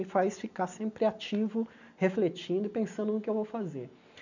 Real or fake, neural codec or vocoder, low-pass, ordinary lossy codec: real; none; 7.2 kHz; none